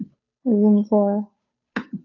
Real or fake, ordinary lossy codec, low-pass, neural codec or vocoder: fake; AAC, 48 kbps; 7.2 kHz; codec, 16 kHz, 2 kbps, FunCodec, trained on Chinese and English, 25 frames a second